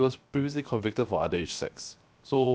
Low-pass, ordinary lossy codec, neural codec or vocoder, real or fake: none; none; codec, 16 kHz, 0.7 kbps, FocalCodec; fake